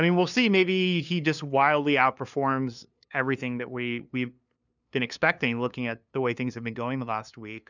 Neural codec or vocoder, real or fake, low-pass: codec, 16 kHz, 2 kbps, FunCodec, trained on LibriTTS, 25 frames a second; fake; 7.2 kHz